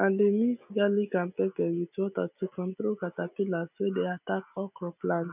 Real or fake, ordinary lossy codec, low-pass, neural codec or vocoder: real; none; 3.6 kHz; none